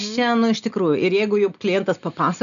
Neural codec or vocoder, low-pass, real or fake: none; 7.2 kHz; real